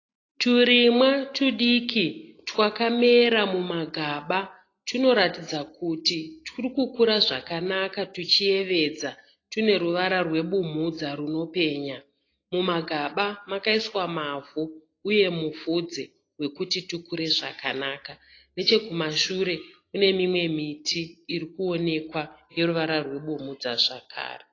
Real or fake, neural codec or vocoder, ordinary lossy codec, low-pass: real; none; AAC, 32 kbps; 7.2 kHz